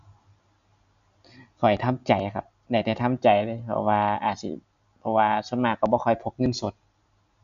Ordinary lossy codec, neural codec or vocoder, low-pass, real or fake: none; none; 7.2 kHz; real